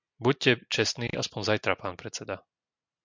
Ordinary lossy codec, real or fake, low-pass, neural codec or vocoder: MP3, 64 kbps; real; 7.2 kHz; none